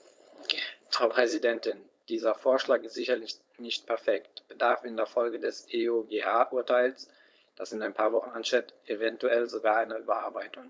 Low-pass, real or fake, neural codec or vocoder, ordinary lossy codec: none; fake; codec, 16 kHz, 4.8 kbps, FACodec; none